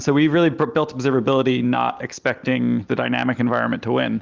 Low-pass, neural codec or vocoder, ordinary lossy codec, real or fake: 7.2 kHz; none; Opus, 32 kbps; real